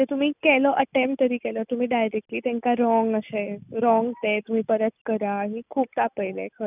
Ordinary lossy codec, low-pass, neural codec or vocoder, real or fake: none; 3.6 kHz; none; real